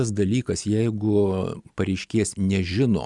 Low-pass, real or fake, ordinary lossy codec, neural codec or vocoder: 10.8 kHz; real; Opus, 64 kbps; none